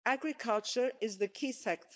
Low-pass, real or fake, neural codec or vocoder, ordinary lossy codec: none; fake; codec, 16 kHz, 4.8 kbps, FACodec; none